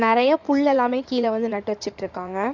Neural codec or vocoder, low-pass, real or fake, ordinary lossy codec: codec, 16 kHz in and 24 kHz out, 2.2 kbps, FireRedTTS-2 codec; 7.2 kHz; fake; none